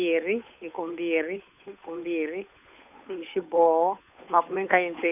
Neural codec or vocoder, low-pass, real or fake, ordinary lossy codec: codec, 16 kHz, 8 kbps, FunCodec, trained on Chinese and English, 25 frames a second; 3.6 kHz; fake; none